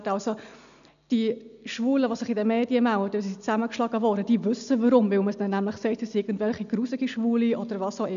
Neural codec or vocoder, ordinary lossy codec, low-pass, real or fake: none; none; 7.2 kHz; real